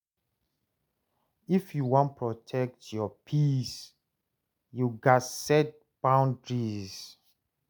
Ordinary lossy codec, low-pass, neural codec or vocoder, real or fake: none; none; none; real